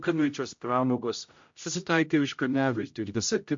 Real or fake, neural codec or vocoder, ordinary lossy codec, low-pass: fake; codec, 16 kHz, 0.5 kbps, X-Codec, HuBERT features, trained on general audio; MP3, 48 kbps; 7.2 kHz